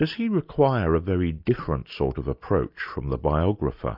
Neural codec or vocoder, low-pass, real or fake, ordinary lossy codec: none; 5.4 kHz; real; MP3, 48 kbps